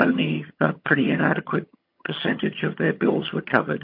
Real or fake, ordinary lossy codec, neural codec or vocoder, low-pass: fake; MP3, 32 kbps; vocoder, 22.05 kHz, 80 mel bands, HiFi-GAN; 5.4 kHz